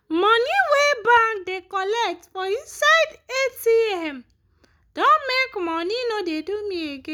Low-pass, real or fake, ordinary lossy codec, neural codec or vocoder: none; real; none; none